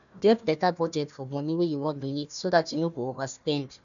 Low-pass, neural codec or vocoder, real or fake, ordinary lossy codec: 7.2 kHz; codec, 16 kHz, 1 kbps, FunCodec, trained on Chinese and English, 50 frames a second; fake; none